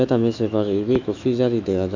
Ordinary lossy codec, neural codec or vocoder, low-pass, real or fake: none; autoencoder, 48 kHz, 128 numbers a frame, DAC-VAE, trained on Japanese speech; 7.2 kHz; fake